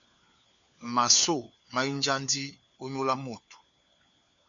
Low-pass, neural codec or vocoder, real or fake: 7.2 kHz; codec, 16 kHz, 4 kbps, FunCodec, trained on LibriTTS, 50 frames a second; fake